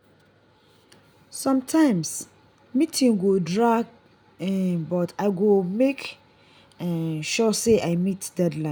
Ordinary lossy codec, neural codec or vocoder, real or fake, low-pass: none; none; real; none